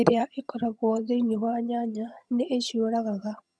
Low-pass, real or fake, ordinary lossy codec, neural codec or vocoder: none; fake; none; vocoder, 22.05 kHz, 80 mel bands, HiFi-GAN